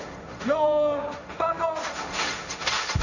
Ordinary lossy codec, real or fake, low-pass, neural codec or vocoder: none; fake; 7.2 kHz; codec, 16 kHz, 1.1 kbps, Voila-Tokenizer